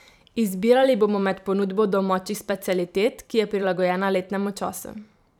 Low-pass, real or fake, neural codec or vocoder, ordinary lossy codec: 19.8 kHz; fake; vocoder, 44.1 kHz, 128 mel bands every 512 samples, BigVGAN v2; none